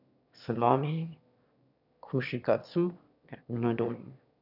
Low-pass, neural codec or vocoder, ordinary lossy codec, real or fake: 5.4 kHz; autoencoder, 22.05 kHz, a latent of 192 numbers a frame, VITS, trained on one speaker; none; fake